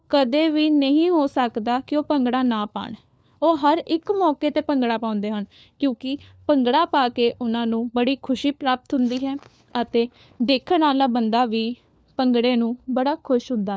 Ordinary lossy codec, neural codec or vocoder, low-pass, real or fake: none; codec, 16 kHz, 4 kbps, FunCodec, trained on LibriTTS, 50 frames a second; none; fake